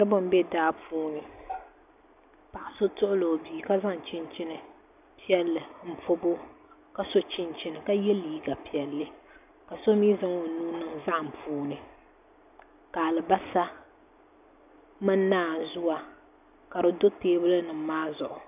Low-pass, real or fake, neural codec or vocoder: 3.6 kHz; real; none